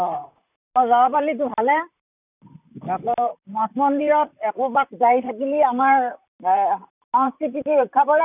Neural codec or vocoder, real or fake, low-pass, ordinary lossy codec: vocoder, 44.1 kHz, 128 mel bands, Pupu-Vocoder; fake; 3.6 kHz; none